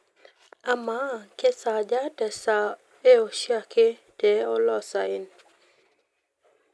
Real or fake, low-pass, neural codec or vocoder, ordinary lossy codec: real; none; none; none